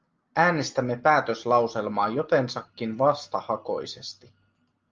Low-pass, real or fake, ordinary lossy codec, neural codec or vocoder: 7.2 kHz; real; Opus, 24 kbps; none